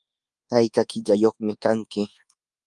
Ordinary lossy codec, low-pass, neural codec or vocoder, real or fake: Opus, 32 kbps; 10.8 kHz; codec, 24 kHz, 1.2 kbps, DualCodec; fake